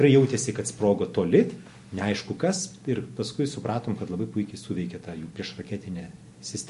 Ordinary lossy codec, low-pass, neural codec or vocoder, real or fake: MP3, 48 kbps; 14.4 kHz; none; real